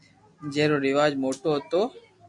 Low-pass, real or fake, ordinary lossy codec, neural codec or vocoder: 10.8 kHz; real; MP3, 64 kbps; none